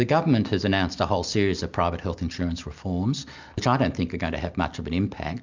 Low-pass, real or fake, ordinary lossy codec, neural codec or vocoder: 7.2 kHz; real; MP3, 64 kbps; none